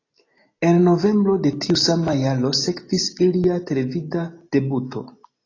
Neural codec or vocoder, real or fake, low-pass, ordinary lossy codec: none; real; 7.2 kHz; AAC, 32 kbps